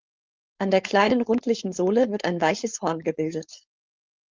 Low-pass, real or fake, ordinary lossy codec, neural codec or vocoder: 7.2 kHz; fake; Opus, 32 kbps; codec, 16 kHz, 4.8 kbps, FACodec